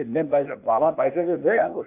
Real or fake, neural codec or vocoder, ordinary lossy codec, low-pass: fake; codec, 16 kHz, 0.8 kbps, ZipCodec; AAC, 32 kbps; 3.6 kHz